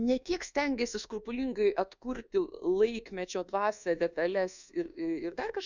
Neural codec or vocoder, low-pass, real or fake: codec, 24 kHz, 1.2 kbps, DualCodec; 7.2 kHz; fake